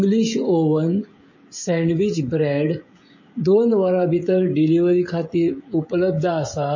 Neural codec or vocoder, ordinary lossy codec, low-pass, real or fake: codec, 16 kHz, 16 kbps, FreqCodec, smaller model; MP3, 32 kbps; 7.2 kHz; fake